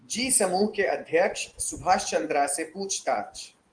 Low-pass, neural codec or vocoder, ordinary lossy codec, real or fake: 9.9 kHz; vocoder, 24 kHz, 100 mel bands, Vocos; Opus, 24 kbps; fake